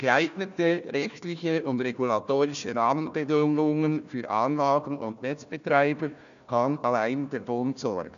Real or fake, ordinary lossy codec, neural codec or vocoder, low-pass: fake; none; codec, 16 kHz, 1 kbps, FunCodec, trained on Chinese and English, 50 frames a second; 7.2 kHz